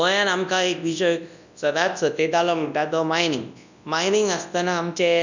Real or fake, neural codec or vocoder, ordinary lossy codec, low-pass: fake; codec, 24 kHz, 0.9 kbps, WavTokenizer, large speech release; none; 7.2 kHz